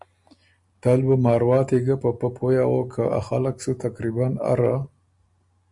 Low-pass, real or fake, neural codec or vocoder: 10.8 kHz; real; none